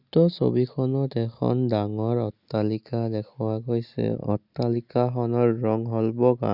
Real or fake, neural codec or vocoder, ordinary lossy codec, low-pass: real; none; Opus, 64 kbps; 5.4 kHz